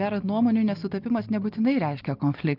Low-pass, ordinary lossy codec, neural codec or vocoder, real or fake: 5.4 kHz; Opus, 32 kbps; none; real